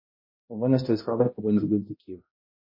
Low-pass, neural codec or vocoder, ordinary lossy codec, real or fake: 5.4 kHz; codec, 16 kHz, 0.5 kbps, X-Codec, HuBERT features, trained on balanced general audio; MP3, 24 kbps; fake